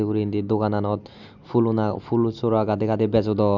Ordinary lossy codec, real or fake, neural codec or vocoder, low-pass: none; real; none; 7.2 kHz